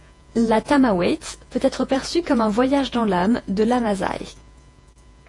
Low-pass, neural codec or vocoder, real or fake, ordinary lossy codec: 10.8 kHz; vocoder, 48 kHz, 128 mel bands, Vocos; fake; AAC, 48 kbps